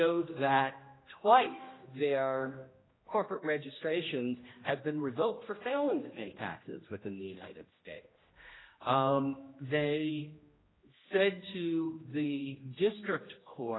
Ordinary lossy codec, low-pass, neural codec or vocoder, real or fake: AAC, 16 kbps; 7.2 kHz; codec, 16 kHz, 1 kbps, X-Codec, HuBERT features, trained on general audio; fake